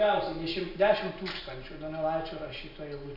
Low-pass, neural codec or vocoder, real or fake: 5.4 kHz; none; real